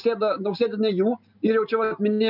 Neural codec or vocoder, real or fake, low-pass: none; real; 5.4 kHz